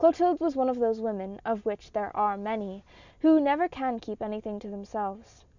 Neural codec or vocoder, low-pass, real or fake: none; 7.2 kHz; real